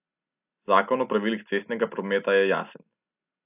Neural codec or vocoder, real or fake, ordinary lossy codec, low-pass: none; real; AAC, 32 kbps; 3.6 kHz